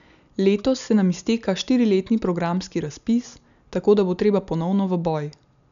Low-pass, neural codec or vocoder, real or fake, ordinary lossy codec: 7.2 kHz; none; real; none